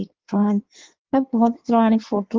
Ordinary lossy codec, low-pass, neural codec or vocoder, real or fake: Opus, 16 kbps; 7.2 kHz; codec, 16 kHz in and 24 kHz out, 1.1 kbps, FireRedTTS-2 codec; fake